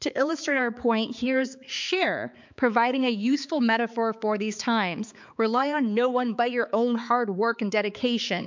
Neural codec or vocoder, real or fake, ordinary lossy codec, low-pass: codec, 16 kHz, 4 kbps, X-Codec, HuBERT features, trained on balanced general audio; fake; MP3, 64 kbps; 7.2 kHz